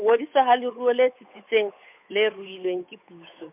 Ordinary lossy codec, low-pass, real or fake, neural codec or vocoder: MP3, 32 kbps; 3.6 kHz; fake; codec, 16 kHz, 8 kbps, FunCodec, trained on Chinese and English, 25 frames a second